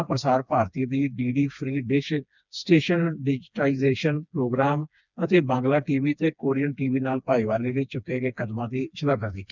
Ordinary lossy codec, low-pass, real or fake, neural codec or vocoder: none; 7.2 kHz; fake; codec, 16 kHz, 2 kbps, FreqCodec, smaller model